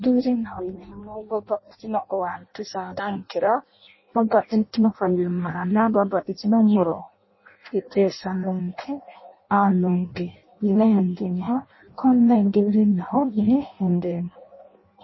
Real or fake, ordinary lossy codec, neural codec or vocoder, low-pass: fake; MP3, 24 kbps; codec, 16 kHz in and 24 kHz out, 0.6 kbps, FireRedTTS-2 codec; 7.2 kHz